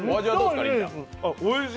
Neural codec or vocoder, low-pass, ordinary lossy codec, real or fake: none; none; none; real